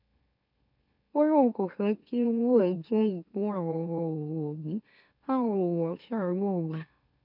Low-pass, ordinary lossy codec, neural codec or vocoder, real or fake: 5.4 kHz; none; autoencoder, 44.1 kHz, a latent of 192 numbers a frame, MeloTTS; fake